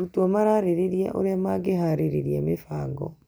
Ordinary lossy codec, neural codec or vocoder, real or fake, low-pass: none; none; real; none